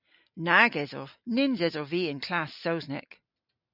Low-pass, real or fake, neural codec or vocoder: 5.4 kHz; real; none